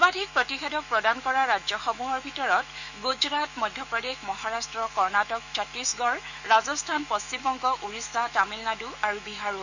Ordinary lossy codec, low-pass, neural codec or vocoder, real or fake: none; 7.2 kHz; autoencoder, 48 kHz, 128 numbers a frame, DAC-VAE, trained on Japanese speech; fake